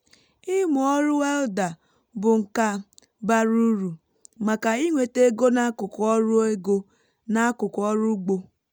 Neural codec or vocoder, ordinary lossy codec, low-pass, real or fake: none; none; none; real